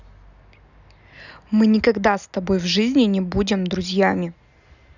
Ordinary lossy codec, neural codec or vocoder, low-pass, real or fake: none; none; 7.2 kHz; real